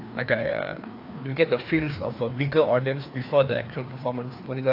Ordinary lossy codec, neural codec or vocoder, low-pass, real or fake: AAC, 32 kbps; codec, 16 kHz, 2 kbps, FreqCodec, larger model; 5.4 kHz; fake